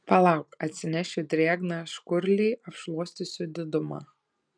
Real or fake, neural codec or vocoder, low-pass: real; none; 9.9 kHz